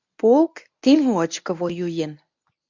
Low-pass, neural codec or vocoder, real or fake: 7.2 kHz; codec, 24 kHz, 0.9 kbps, WavTokenizer, medium speech release version 1; fake